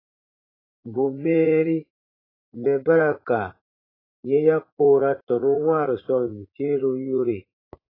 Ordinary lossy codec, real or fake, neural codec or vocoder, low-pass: AAC, 24 kbps; fake; vocoder, 44.1 kHz, 80 mel bands, Vocos; 5.4 kHz